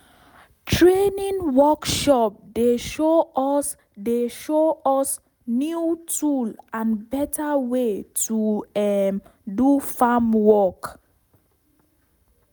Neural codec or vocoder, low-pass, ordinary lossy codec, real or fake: none; none; none; real